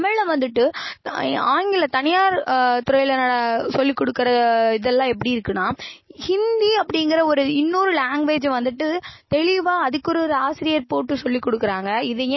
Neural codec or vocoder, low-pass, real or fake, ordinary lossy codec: none; 7.2 kHz; real; MP3, 24 kbps